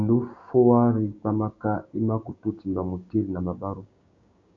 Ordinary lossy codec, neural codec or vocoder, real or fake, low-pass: AAC, 48 kbps; codec, 16 kHz, 6 kbps, DAC; fake; 7.2 kHz